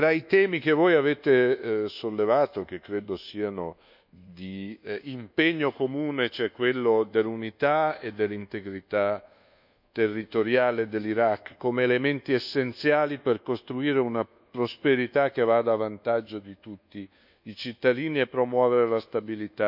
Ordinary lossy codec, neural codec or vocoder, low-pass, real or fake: none; codec, 24 kHz, 1.2 kbps, DualCodec; 5.4 kHz; fake